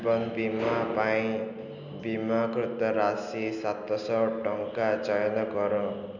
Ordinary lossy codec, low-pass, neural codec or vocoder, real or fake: none; 7.2 kHz; none; real